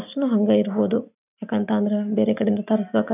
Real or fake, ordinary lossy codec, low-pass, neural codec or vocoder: real; none; 3.6 kHz; none